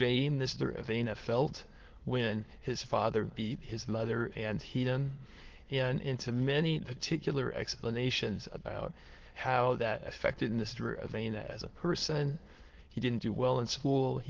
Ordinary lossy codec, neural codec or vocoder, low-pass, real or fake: Opus, 16 kbps; autoencoder, 22.05 kHz, a latent of 192 numbers a frame, VITS, trained on many speakers; 7.2 kHz; fake